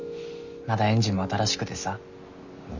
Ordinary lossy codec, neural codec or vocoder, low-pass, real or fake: none; none; 7.2 kHz; real